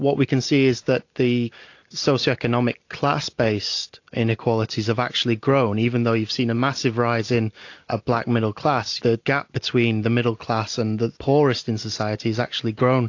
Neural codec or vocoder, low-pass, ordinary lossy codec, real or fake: none; 7.2 kHz; AAC, 48 kbps; real